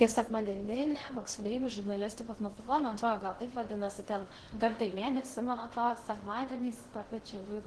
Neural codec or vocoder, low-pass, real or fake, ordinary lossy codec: codec, 16 kHz in and 24 kHz out, 0.6 kbps, FocalCodec, streaming, 2048 codes; 10.8 kHz; fake; Opus, 16 kbps